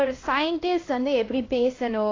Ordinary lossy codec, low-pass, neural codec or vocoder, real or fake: AAC, 32 kbps; 7.2 kHz; codec, 16 kHz, 1 kbps, X-Codec, HuBERT features, trained on LibriSpeech; fake